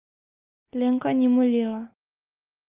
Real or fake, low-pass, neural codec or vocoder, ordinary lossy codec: real; 3.6 kHz; none; Opus, 24 kbps